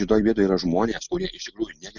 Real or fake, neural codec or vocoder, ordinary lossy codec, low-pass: real; none; Opus, 64 kbps; 7.2 kHz